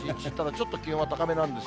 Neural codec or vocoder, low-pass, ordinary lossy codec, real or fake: none; none; none; real